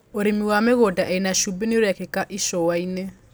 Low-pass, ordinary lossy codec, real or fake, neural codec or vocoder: none; none; real; none